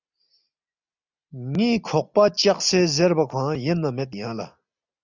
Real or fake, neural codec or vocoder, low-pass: real; none; 7.2 kHz